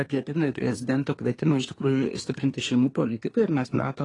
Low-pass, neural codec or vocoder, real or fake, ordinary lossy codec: 10.8 kHz; codec, 24 kHz, 1 kbps, SNAC; fake; AAC, 32 kbps